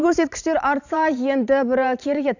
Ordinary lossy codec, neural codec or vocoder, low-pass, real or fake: none; vocoder, 44.1 kHz, 128 mel bands every 512 samples, BigVGAN v2; 7.2 kHz; fake